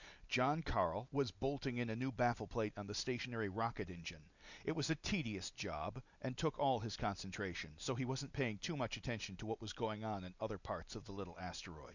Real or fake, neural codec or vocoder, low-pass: real; none; 7.2 kHz